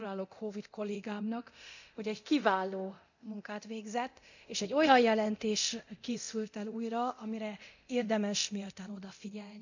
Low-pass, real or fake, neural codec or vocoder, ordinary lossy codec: 7.2 kHz; fake; codec, 24 kHz, 0.9 kbps, DualCodec; none